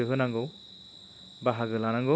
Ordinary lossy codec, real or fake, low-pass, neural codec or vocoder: none; real; none; none